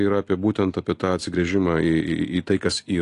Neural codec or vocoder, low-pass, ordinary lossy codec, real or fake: none; 10.8 kHz; AAC, 48 kbps; real